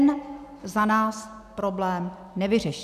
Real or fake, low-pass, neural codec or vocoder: real; 14.4 kHz; none